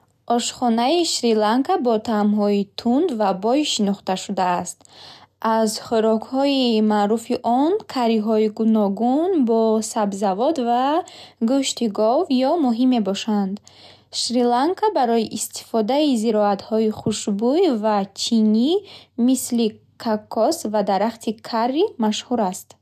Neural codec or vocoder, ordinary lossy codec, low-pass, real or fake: none; none; 14.4 kHz; real